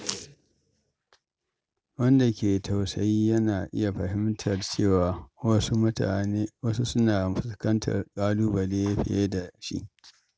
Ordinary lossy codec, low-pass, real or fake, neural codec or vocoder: none; none; real; none